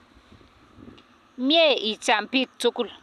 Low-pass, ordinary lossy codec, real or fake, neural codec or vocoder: 14.4 kHz; none; real; none